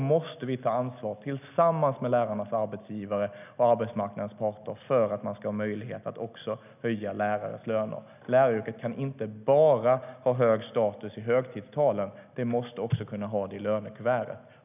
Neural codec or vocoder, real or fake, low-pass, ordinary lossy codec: none; real; 3.6 kHz; none